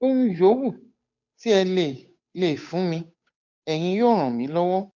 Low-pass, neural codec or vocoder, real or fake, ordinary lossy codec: 7.2 kHz; codec, 16 kHz, 8 kbps, FunCodec, trained on Chinese and English, 25 frames a second; fake; MP3, 64 kbps